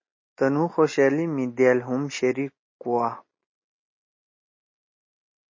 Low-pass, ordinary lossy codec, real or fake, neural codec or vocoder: 7.2 kHz; MP3, 32 kbps; real; none